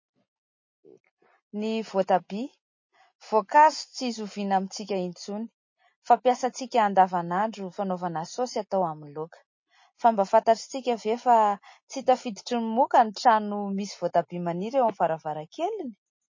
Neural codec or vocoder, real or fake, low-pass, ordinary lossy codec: none; real; 7.2 kHz; MP3, 32 kbps